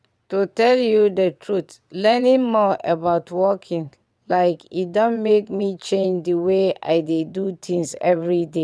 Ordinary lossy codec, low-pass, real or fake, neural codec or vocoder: none; none; fake; vocoder, 22.05 kHz, 80 mel bands, WaveNeXt